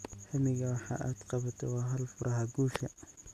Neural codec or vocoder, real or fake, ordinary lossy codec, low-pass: none; real; none; 14.4 kHz